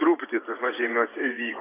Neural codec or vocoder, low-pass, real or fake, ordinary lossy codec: vocoder, 24 kHz, 100 mel bands, Vocos; 3.6 kHz; fake; AAC, 16 kbps